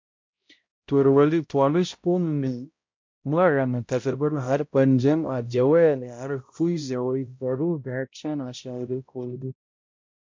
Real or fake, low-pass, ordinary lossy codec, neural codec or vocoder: fake; 7.2 kHz; MP3, 48 kbps; codec, 16 kHz, 0.5 kbps, X-Codec, HuBERT features, trained on balanced general audio